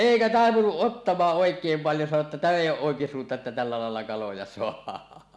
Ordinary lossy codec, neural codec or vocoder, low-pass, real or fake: none; none; none; real